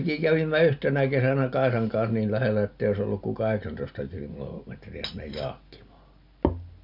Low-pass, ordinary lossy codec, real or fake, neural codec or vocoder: 5.4 kHz; none; real; none